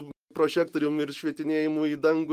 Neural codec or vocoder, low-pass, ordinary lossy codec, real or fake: none; 14.4 kHz; Opus, 24 kbps; real